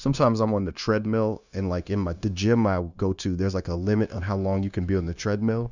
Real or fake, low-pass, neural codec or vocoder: fake; 7.2 kHz; codec, 16 kHz, 0.9 kbps, LongCat-Audio-Codec